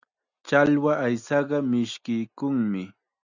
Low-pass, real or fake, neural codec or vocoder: 7.2 kHz; real; none